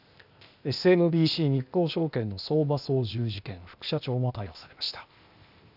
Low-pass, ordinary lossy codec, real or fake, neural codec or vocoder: 5.4 kHz; none; fake; codec, 16 kHz, 0.8 kbps, ZipCodec